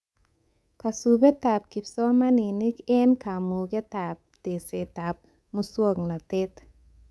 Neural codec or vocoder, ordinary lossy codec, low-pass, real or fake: codec, 24 kHz, 3.1 kbps, DualCodec; none; none; fake